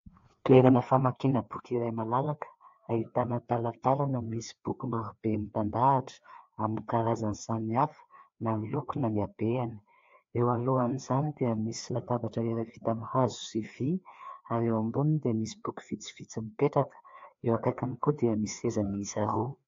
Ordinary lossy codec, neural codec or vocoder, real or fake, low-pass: AAC, 48 kbps; codec, 16 kHz, 2 kbps, FreqCodec, larger model; fake; 7.2 kHz